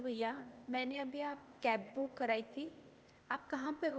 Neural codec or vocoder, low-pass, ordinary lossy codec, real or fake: codec, 16 kHz, 0.8 kbps, ZipCodec; none; none; fake